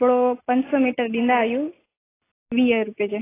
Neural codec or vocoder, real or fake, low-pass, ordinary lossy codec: none; real; 3.6 kHz; AAC, 16 kbps